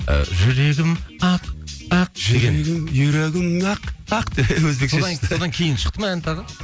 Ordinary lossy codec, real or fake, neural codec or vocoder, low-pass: none; real; none; none